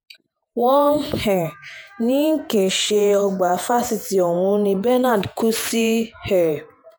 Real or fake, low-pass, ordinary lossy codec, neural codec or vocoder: fake; none; none; vocoder, 48 kHz, 128 mel bands, Vocos